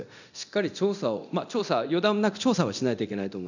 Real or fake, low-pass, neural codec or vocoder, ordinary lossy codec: fake; 7.2 kHz; codec, 24 kHz, 0.9 kbps, DualCodec; none